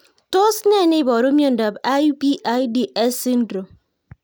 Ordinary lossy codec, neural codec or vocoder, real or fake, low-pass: none; none; real; none